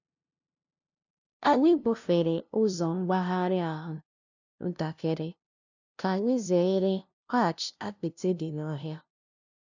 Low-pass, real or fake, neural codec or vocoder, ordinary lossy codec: 7.2 kHz; fake; codec, 16 kHz, 0.5 kbps, FunCodec, trained on LibriTTS, 25 frames a second; none